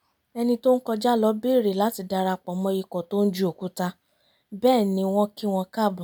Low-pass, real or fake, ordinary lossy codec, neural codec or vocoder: none; real; none; none